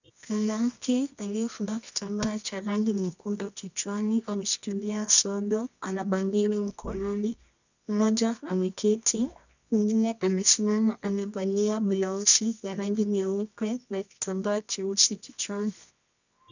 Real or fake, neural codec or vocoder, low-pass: fake; codec, 24 kHz, 0.9 kbps, WavTokenizer, medium music audio release; 7.2 kHz